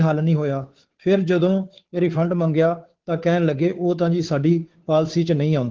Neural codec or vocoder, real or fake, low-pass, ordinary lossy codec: codec, 16 kHz, 2 kbps, FunCodec, trained on Chinese and English, 25 frames a second; fake; 7.2 kHz; Opus, 16 kbps